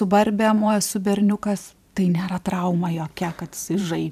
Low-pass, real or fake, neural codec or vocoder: 14.4 kHz; fake; vocoder, 44.1 kHz, 128 mel bands every 512 samples, BigVGAN v2